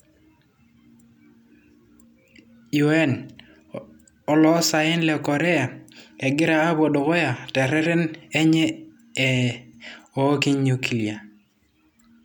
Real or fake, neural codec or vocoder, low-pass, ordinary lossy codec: real; none; 19.8 kHz; none